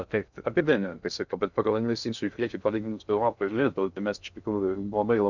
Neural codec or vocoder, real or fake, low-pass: codec, 16 kHz in and 24 kHz out, 0.6 kbps, FocalCodec, streaming, 2048 codes; fake; 7.2 kHz